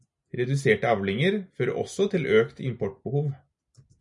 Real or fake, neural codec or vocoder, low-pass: real; none; 10.8 kHz